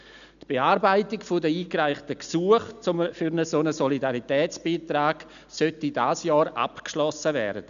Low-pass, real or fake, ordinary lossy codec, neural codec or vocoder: 7.2 kHz; real; none; none